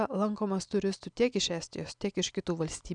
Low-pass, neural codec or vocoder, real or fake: 9.9 kHz; none; real